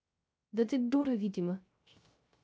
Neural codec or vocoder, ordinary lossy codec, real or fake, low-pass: codec, 16 kHz, 0.3 kbps, FocalCodec; none; fake; none